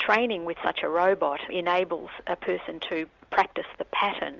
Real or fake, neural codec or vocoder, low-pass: real; none; 7.2 kHz